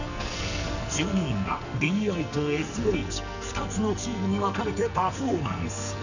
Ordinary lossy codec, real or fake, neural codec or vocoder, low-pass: none; fake; codec, 44.1 kHz, 2.6 kbps, SNAC; 7.2 kHz